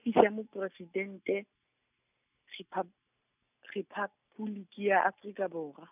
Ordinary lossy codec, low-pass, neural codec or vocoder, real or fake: none; 3.6 kHz; none; real